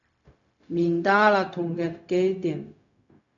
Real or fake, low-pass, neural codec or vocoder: fake; 7.2 kHz; codec, 16 kHz, 0.4 kbps, LongCat-Audio-Codec